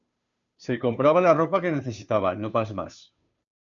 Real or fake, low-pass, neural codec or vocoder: fake; 7.2 kHz; codec, 16 kHz, 2 kbps, FunCodec, trained on Chinese and English, 25 frames a second